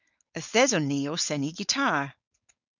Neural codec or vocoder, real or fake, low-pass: codec, 16 kHz, 4.8 kbps, FACodec; fake; 7.2 kHz